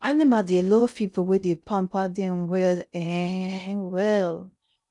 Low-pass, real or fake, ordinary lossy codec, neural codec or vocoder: 10.8 kHz; fake; none; codec, 16 kHz in and 24 kHz out, 0.6 kbps, FocalCodec, streaming, 2048 codes